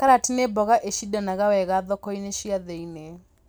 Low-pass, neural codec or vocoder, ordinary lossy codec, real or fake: none; none; none; real